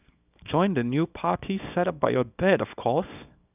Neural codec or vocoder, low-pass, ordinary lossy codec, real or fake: codec, 24 kHz, 0.9 kbps, WavTokenizer, small release; 3.6 kHz; none; fake